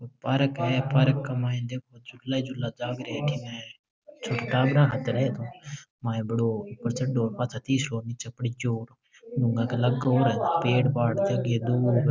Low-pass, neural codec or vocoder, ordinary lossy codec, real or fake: none; none; none; real